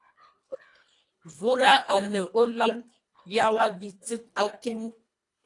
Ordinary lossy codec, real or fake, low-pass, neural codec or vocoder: AAC, 48 kbps; fake; 10.8 kHz; codec, 24 kHz, 1.5 kbps, HILCodec